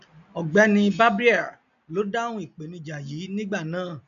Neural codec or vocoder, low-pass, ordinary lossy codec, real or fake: none; 7.2 kHz; none; real